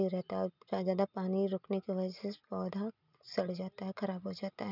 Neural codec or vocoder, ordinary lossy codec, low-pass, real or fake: none; none; 5.4 kHz; real